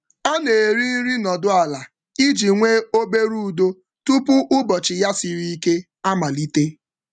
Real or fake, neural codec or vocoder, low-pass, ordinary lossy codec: real; none; 9.9 kHz; none